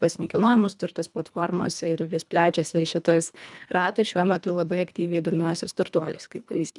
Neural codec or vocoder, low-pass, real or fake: codec, 24 kHz, 1.5 kbps, HILCodec; 10.8 kHz; fake